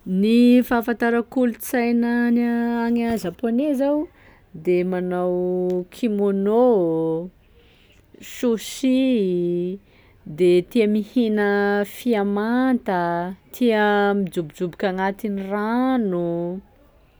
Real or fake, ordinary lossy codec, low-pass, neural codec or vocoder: real; none; none; none